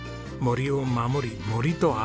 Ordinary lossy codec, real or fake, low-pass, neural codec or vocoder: none; real; none; none